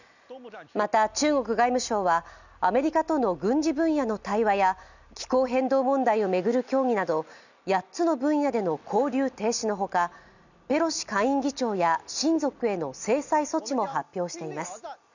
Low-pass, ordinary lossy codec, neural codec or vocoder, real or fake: 7.2 kHz; none; none; real